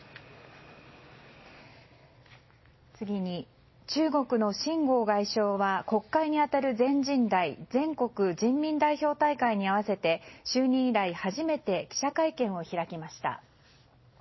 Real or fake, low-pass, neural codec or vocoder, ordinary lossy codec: real; 7.2 kHz; none; MP3, 24 kbps